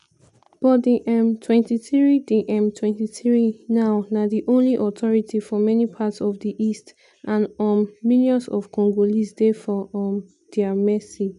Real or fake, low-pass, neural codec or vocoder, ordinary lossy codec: real; 10.8 kHz; none; none